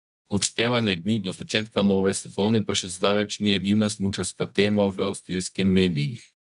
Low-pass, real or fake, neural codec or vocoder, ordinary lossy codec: 10.8 kHz; fake; codec, 24 kHz, 0.9 kbps, WavTokenizer, medium music audio release; none